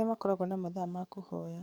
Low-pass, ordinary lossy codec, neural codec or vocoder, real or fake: none; none; codec, 44.1 kHz, 7.8 kbps, DAC; fake